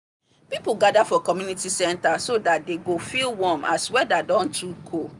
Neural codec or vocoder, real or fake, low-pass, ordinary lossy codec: none; real; 10.8 kHz; none